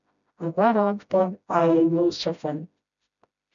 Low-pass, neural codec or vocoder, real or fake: 7.2 kHz; codec, 16 kHz, 0.5 kbps, FreqCodec, smaller model; fake